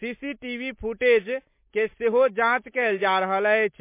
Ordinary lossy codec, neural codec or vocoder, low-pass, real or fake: MP3, 24 kbps; none; 3.6 kHz; real